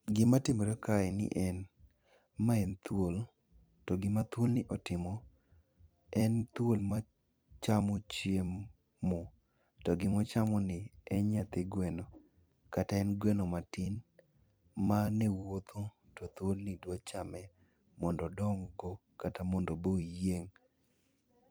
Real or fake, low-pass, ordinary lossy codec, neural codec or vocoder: fake; none; none; vocoder, 44.1 kHz, 128 mel bands every 256 samples, BigVGAN v2